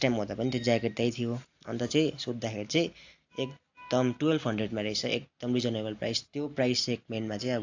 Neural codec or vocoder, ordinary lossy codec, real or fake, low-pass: none; AAC, 48 kbps; real; 7.2 kHz